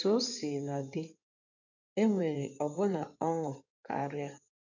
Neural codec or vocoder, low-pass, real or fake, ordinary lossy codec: codec, 16 kHz in and 24 kHz out, 2.2 kbps, FireRedTTS-2 codec; 7.2 kHz; fake; none